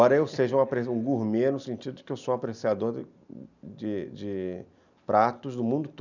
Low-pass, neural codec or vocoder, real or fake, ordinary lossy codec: 7.2 kHz; none; real; none